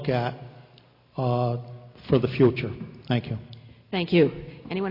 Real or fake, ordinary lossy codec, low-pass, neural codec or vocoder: real; MP3, 32 kbps; 5.4 kHz; none